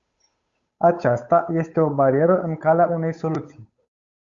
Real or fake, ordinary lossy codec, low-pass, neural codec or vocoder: fake; Opus, 64 kbps; 7.2 kHz; codec, 16 kHz, 8 kbps, FunCodec, trained on Chinese and English, 25 frames a second